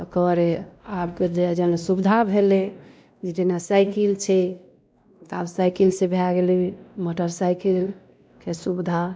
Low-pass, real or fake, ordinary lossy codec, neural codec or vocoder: none; fake; none; codec, 16 kHz, 1 kbps, X-Codec, WavLM features, trained on Multilingual LibriSpeech